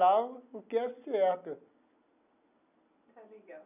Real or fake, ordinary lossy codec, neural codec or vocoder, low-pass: real; none; none; 3.6 kHz